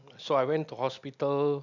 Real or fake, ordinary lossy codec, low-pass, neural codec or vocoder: real; none; 7.2 kHz; none